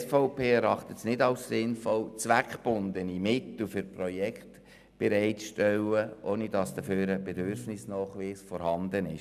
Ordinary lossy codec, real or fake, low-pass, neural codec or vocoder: none; real; 14.4 kHz; none